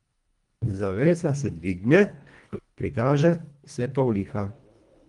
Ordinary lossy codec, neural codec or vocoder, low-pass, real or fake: Opus, 32 kbps; codec, 24 kHz, 1.5 kbps, HILCodec; 10.8 kHz; fake